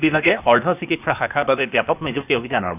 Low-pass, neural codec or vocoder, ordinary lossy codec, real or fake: 3.6 kHz; codec, 16 kHz, 0.8 kbps, ZipCodec; none; fake